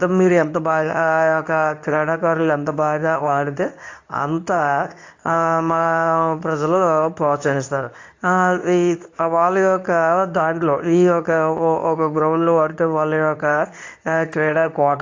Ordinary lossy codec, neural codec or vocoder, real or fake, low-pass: AAC, 48 kbps; codec, 24 kHz, 0.9 kbps, WavTokenizer, medium speech release version 2; fake; 7.2 kHz